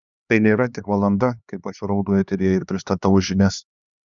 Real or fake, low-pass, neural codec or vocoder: fake; 7.2 kHz; codec, 16 kHz, 2 kbps, X-Codec, HuBERT features, trained on LibriSpeech